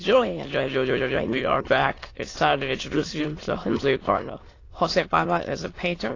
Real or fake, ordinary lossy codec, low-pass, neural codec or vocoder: fake; AAC, 32 kbps; 7.2 kHz; autoencoder, 22.05 kHz, a latent of 192 numbers a frame, VITS, trained on many speakers